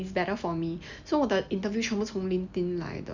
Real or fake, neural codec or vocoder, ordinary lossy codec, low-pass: real; none; none; 7.2 kHz